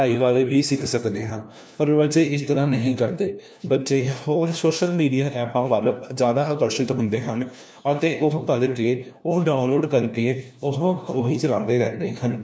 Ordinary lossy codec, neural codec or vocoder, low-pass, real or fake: none; codec, 16 kHz, 1 kbps, FunCodec, trained on LibriTTS, 50 frames a second; none; fake